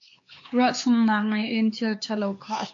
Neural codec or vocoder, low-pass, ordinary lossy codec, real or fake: codec, 16 kHz, 4 kbps, X-Codec, HuBERT features, trained on LibriSpeech; 7.2 kHz; AAC, 48 kbps; fake